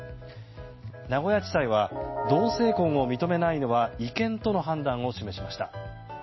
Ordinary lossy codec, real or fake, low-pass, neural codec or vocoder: MP3, 24 kbps; real; 7.2 kHz; none